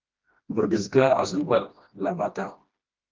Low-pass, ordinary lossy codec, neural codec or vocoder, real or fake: 7.2 kHz; Opus, 32 kbps; codec, 16 kHz, 1 kbps, FreqCodec, smaller model; fake